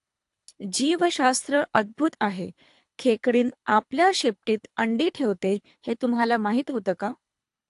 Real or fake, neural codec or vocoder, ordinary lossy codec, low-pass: fake; codec, 24 kHz, 3 kbps, HILCodec; AAC, 64 kbps; 10.8 kHz